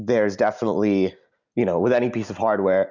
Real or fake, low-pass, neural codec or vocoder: real; 7.2 kHz; none